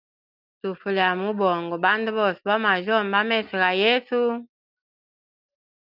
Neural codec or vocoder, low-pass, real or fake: none; 5.4 kHz; real